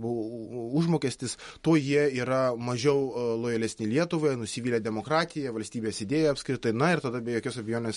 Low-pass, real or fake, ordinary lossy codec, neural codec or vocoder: 19.8 kHz; real; MP3, 48 kbps; none